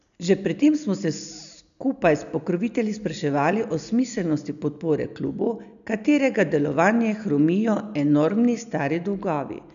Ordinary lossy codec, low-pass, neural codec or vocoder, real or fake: none; 7.2 kHz; none; real